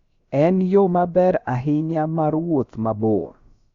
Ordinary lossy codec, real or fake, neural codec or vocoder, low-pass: Opus, 64 kbps; fake; codec, 16 kHz, about 1 kbps, DyCAST, with the encoder's durations; 7.2 kHz